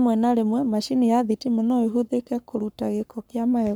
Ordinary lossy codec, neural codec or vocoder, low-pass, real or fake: none; codec, 44.1 kHz, 7.8 kbps, Pupu-Codec; none; fake